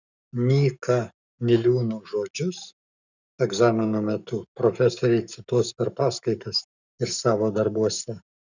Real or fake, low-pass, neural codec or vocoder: fake; 7.2 kHz; codec, 44.1 kHz, 7.8 kbps, Pupu-Codec